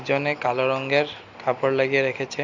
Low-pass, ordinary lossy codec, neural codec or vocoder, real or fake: 7.2 kHz; none; none; real